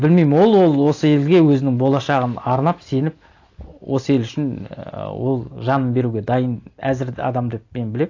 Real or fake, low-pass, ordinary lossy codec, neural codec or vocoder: real; 7.2 kHz; AAC, 48 kbps; none